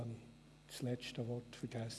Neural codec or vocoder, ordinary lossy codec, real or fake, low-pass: none; none; real; none